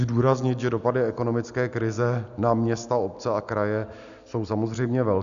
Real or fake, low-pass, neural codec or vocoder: real; 7.2 kHz; none